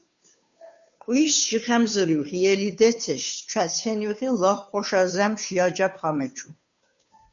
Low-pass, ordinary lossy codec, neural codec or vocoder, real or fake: 7.2 kHz; MP3, 96 kbps; codec, 16 kHz, 2 kbps, FunCodec, trained on Chinese and English, 25 frames a second; fake